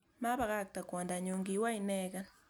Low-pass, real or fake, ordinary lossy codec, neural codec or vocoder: none; real; none; none